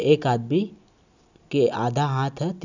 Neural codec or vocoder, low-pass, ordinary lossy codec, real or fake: none; 7.2 kHz; none; real